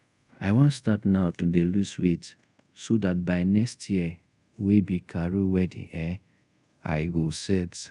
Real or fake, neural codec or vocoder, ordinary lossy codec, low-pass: fake; codec, 24 kHz, 0.5 kbps, DualCodec; none; 10.8 kHz